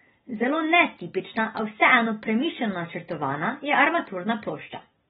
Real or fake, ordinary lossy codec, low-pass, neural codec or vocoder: real; AAC, 16 kbps; 14.4 kHz; none